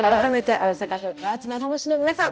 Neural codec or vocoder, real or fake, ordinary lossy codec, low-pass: codec, 16 kHz, 0.5 kbps, X-Codec, HuBERT features, trained on balanced general audio; fake; none; none